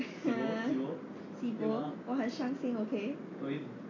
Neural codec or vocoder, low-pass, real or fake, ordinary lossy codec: none; 7.2 kHz; real; AAC, 32 kbps